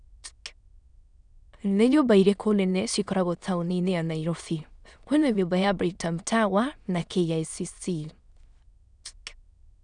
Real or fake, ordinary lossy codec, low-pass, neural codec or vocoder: fake; none; 9.9 kHz; autoencoder, 22.05 kHz, a latent of 192 numbers a frame, VITS, trained on many speakers